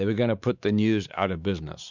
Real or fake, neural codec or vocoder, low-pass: fake; codec, 16 kHz, 4 kbps, X-Codec, WavLM features, trained on Multilingual LibriSpeech; 7.2 kHz